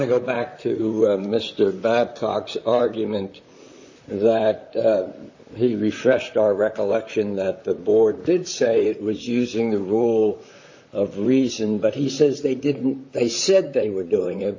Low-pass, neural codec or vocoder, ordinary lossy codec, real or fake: 7.2 kHz; vocoder, 44.1 kHz, 128 mel bands, Pupu-Vocoder; AAC, 48 kbps; fake